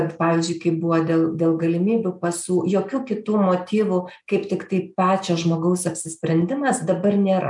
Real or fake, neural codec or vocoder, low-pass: real; none; 10.8 kHz